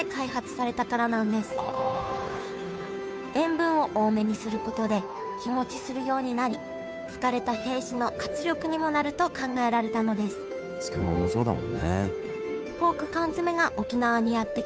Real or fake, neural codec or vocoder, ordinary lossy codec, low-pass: fake; codec, 16 kHz, 2 kbps, FunCodec, trained on Chinese and English, 25 frames a second; none; none